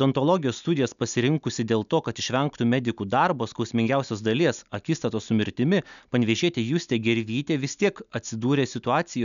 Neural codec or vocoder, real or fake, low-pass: none; real; 7.2 kHz